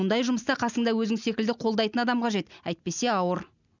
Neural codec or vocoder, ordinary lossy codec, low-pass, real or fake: none; none; 7.2 kHz; real